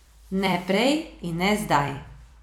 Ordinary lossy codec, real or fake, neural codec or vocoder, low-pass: none; fake; vocoder, 44.1 kHz, 128 mel bands every 256 samples, BigVGAN v2; 19.8 kHz